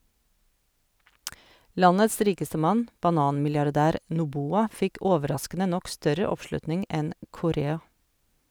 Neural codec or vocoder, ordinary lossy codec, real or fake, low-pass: none; none; real; none